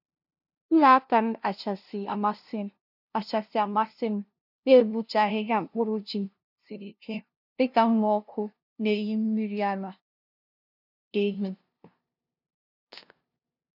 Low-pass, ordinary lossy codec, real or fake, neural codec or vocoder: 5.4 kHz; none; fake; codec, 16 kHz, 0.5 kbps, FunCodec, trained on LibriTTS, 25 frames a second